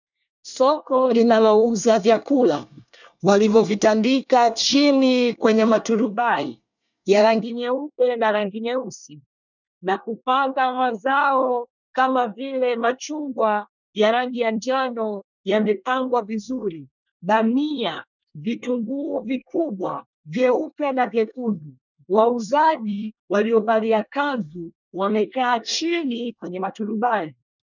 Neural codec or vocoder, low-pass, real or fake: codec, 24 kHz, 1 kbps, SNAC; 7.2 kHz; fake